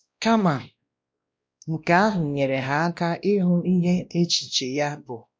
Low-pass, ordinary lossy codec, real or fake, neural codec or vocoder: none; none; fake; codec, 16 kHz, 1 kbps, X-Codec, WavLM features, trained on Multilingual LibriSpeech